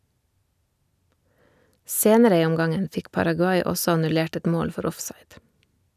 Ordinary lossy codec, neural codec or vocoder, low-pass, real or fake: none; none; 14.4 kHz; real